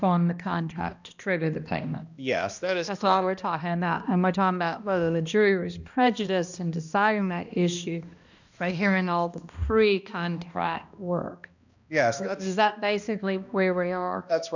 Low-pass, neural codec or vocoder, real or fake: 7.2 kHz; codec, 16 kHz, 1 kbps, X-Codec, HuBERT features, trained on balanced general audio; fake